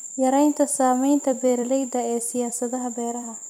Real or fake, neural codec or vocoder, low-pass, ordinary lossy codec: fake; vocoder, 44.1 kHz, 128 mel bands every 256 samples, BigVGAN v2; 19.8 kHz; none